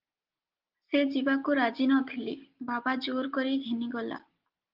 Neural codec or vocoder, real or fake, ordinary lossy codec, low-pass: none; real; Opus, 32 kbps; 5.4 kHz